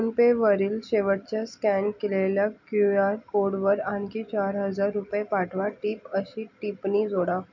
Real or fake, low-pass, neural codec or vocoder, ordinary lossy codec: real; 7.2 kHz; none; none